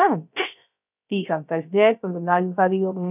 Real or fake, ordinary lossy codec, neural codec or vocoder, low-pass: fake; none; codec, 16 kHz, 0.3 kbps, FocalCodec; 3.6 kHz